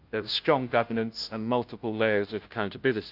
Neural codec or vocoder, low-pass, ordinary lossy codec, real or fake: codec, 16 kHz, 0.5 kbps, FunCodec, trained on Chinese and English, 25 frames a second; 5.4 kHz; Opus, 24 kbps; fake